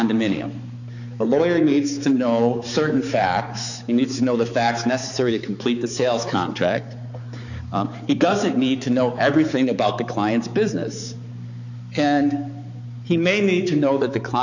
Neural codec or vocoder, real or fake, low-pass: codec, 16 kHz, 4 kbps, X-Codec, HuBERT features, trained on balanced general audio; fake; 7.2 kHz